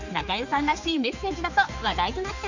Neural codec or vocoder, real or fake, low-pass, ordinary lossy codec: codec, 16 kHz, 4 kbps, X-Codec, HuBERT features, trained on general audio; fake; 7.2 kHz; none